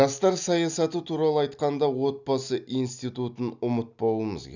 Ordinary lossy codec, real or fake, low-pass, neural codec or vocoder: none; real; 7.2 kHz; none